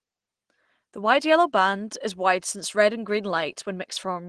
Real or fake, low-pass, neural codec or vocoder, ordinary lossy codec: real; 9.9 kHz; none; Opus, 16 kbps